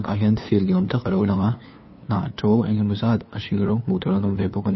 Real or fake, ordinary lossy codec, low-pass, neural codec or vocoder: fake; MP3, 24 kbps; 7.2 kHz; codec, 16 kHz, 2 kbps, FunCodec, trained on LibriTTS, 25 frames a second